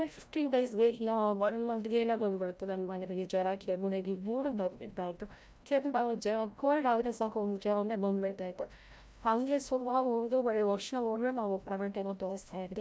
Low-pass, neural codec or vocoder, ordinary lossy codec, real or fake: none; codec, 16 kHz, 0.5 kbps, FreqCodec, larger model; none; fake